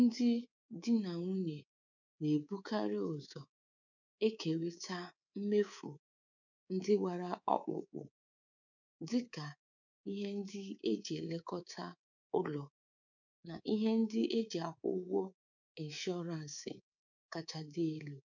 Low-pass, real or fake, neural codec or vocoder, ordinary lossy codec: 7.2 kHz; fake; codec, 24 kHz, 3.1 kbps, DualCodec; none